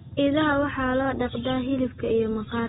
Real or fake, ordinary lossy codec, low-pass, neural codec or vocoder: real; AAC, 16 kbps; 9.9 kHz; none